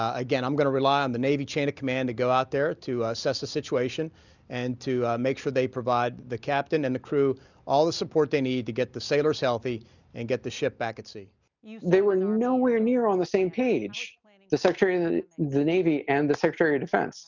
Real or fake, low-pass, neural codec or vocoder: real; 7.2 kHz; none